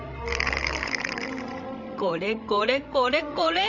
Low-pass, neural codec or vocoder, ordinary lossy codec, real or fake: 7.2 kHz; codec, 16 kHz, 16 kbps, FreqCodec, larger model; none; fake